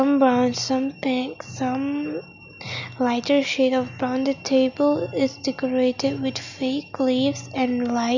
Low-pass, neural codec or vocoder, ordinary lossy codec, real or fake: 7.2 kHz; none; none; real